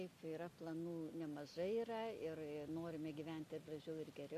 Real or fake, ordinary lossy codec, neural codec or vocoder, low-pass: real; MP3, 96 kbps; none; 14.4 kHz